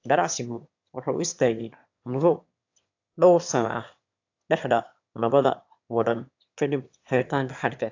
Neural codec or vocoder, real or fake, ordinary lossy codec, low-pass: autoencoder, 22.05 kHz, a latent of 192 numbers a frame, VITS, trained on one speaker; fake; AAC, 48 kbps; 7.2 kHz